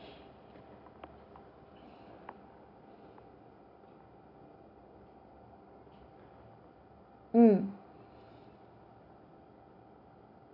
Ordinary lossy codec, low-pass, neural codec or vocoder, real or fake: none; 5.4 kHz; vocoder, 44.1 kHz, 128 mel bands every 256 samples, BigVGAN v2; fake